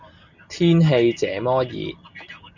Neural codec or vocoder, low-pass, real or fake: none; 7.2 kHz; real